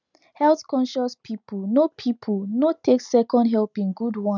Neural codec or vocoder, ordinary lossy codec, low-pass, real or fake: none; none; 7.2 kHz; real